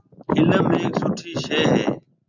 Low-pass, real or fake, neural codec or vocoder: 7.2 kHz; real; none